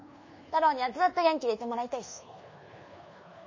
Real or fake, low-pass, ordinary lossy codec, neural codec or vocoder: fake; 7.2 kHz; MP3, 32 kbps; codec, 24 kHz, 1.2 kbps, DualCodec